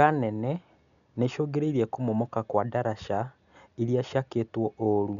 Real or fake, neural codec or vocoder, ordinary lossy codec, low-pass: real; none; none; 7.2 kHz